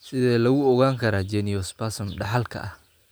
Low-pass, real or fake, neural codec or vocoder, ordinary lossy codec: none; real; none; none